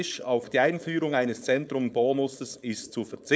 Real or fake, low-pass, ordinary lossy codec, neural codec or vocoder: fake; none; none; codec, 16 kHz, 4.8 kbps, FACodec